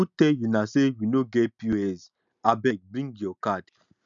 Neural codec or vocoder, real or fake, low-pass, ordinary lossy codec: none; real; 7.2 kHz; MP3, 96 kbps